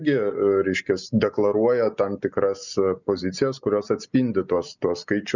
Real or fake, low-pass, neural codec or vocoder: real; 7.2 kHz; none